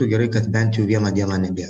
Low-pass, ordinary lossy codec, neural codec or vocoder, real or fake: 14.4 kHz; AAC, 96 kbps; none; real